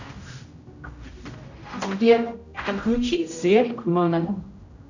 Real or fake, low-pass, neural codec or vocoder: fake; 7.2 kHz; codec, 16 kHz, 0.5 kbps, X-Codec, HuBERT features, trained on general audio